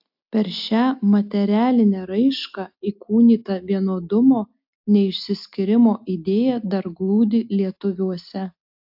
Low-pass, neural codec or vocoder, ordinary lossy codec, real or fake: 5.4 kHz; none; AAC, 48 kbps; real